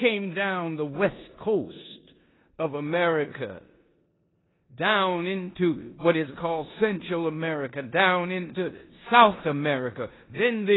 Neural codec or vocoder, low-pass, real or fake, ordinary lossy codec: codec, 16 kHz in and 24 kHz out, 0.9 kbps, LongCat-Audio-Codec, four codebook decoder; 7.2 kHz; fake; AAC, 16 kbps